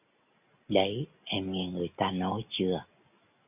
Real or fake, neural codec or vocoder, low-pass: real; none; 3.6 kHz